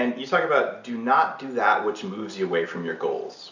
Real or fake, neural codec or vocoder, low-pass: real; none; 7.2 kHz